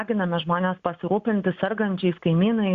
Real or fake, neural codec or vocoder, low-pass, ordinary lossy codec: real; none; 7.2 kHz; Opus, 64 kbps